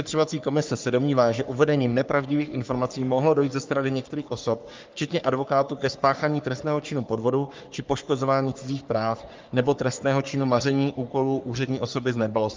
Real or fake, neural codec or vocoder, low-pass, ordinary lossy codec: fake; codec, 44.1 kHz, 3.4 kbps, Pupu-Codec; 7.2 kHz; Opus, 24 kbps